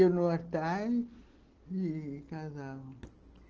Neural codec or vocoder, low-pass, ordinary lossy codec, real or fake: none; 7.2 kHz; Opus, 16 kbps; real